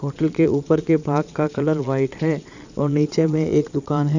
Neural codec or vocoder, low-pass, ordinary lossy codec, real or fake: vocoder, 22.05 kHz, 80 mel bands, Vocos; 7.2 kHz; none; fake